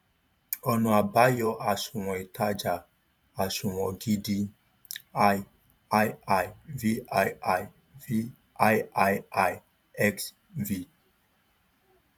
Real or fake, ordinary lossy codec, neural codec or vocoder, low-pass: fake; none; vocoder, 44.1 kHz, 128 mel bands every 512 samples, BigVGAN v2; 19.8 kHz